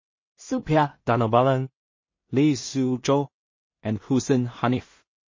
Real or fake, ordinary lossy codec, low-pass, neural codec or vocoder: fake; MP3, 32 kbps; 7.2 kHz; codec, 16 kHz in and 24 kHz out, 0.4 kbps, LongCat-Audio-Codec, two codebook decoder